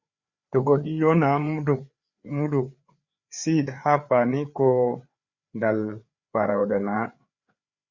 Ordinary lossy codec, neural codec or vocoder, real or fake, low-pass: Opus, 64 kbps; codec, 16 kHz, 4 kbps, FreqCodec, larger model; fake; 7.2 kHz